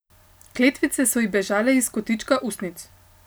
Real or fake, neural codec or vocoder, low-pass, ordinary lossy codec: real; none; none; none